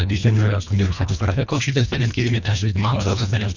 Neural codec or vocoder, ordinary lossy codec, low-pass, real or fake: codec, 24 kHz, 1.5 kbps, HILCodec; none; 7.2 kHz; fake